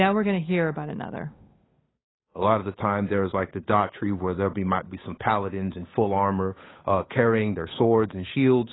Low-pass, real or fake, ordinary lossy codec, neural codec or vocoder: 7.2 kHz; fake; AAC, 16 kbps; codec, 16 kHz, 8 kbps, FunCodec, trained on Chinese and English, 25 frames a second